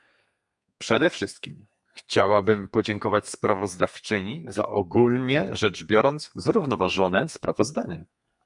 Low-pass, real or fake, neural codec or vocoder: 10.8 kHz; fake; codec, 32 kHz, 1.9 kbps, SNAC